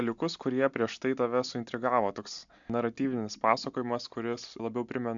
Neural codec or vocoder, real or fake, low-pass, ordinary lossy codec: none; real; 7.2 kHz; MP3, 64 kbps